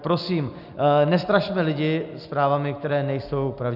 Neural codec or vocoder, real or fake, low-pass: none; real; 5.4 kHz